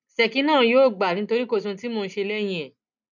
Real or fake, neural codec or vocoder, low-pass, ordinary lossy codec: real; none; 7.2 kHz; none